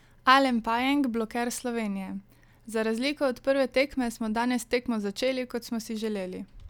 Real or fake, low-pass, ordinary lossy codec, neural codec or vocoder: real; 19.8 kHz; none; none